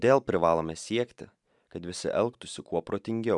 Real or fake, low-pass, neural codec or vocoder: fake; 10.8 kHz; vocoder, 44.1 kHz, 128 mel bands every 512 samples, BigVGAN v2